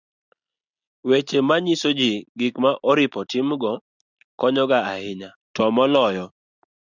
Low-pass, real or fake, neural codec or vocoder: 7.2 kHz; real; none